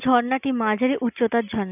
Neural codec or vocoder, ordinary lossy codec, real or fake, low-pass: none; none; real; 3.6 kHz